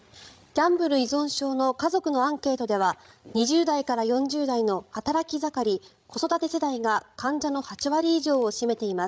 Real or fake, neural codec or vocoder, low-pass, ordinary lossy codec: fake; codec, 16 kHz, 16 kbps, FreqCodec, larger model; none; none